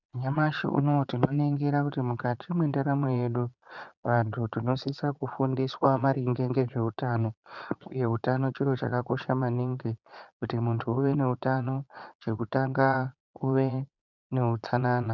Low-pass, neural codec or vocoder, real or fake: 7.2 kHz; vocoder, 22.05 kHz, 80 mel bands, Vocos; fake